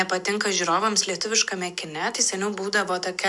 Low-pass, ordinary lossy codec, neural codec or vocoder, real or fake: 10.8 kHz; MP3, 96 kbps; none; real